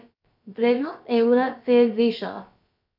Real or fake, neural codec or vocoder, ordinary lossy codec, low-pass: fake; codec, 16 kHz, about 1 kbps, DyCAST, with the encoder's durations; none; 5.4 kHz